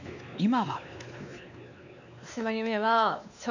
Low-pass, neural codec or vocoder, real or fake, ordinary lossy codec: 7.2 kHz; codec, 16 kHz, 2 kbps, X-Codec, WavLM features, trained on Multilingual LibriSpeech; fake; none